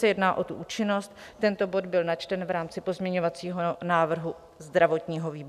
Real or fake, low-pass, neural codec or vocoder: real; 14.4 kHz; none